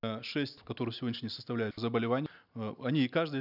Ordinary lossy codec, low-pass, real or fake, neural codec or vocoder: none; 5.4 kHz; real; none